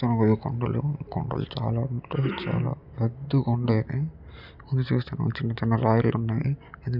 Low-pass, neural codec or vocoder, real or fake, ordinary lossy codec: 5.4 kHz; none; real; none